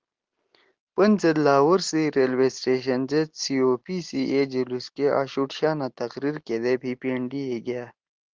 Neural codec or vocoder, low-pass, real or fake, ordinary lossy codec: none; 7.2 kHz; real; Opus, 16 kbps